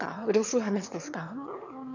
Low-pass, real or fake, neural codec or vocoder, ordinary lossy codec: 7.2 kHz; fake; autoencoder, 22.05 kHz, a latent of 192 numbers a frame, VITS, trained on one speaker; none